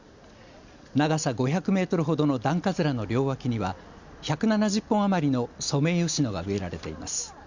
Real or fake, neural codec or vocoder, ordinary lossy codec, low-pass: real; none; Opus, 64 kbps; 7.2 kHz